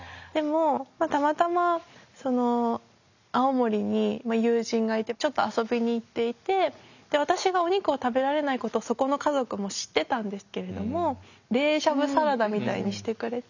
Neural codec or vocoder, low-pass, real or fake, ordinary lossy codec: none; 7.2 kHz; real; none